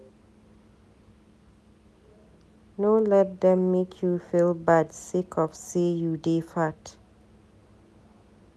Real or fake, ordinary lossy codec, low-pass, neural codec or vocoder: real; none; none; none